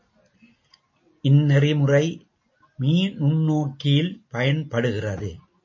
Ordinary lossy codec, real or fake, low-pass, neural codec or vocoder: MP3, 32 kbps; real; 7.2 kHz; none